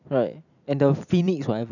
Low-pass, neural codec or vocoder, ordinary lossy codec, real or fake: 7.2 kHz; vocoder, 44.1 kHz, 128 mel bands every 256 samples, BigVGAN v2; none; fake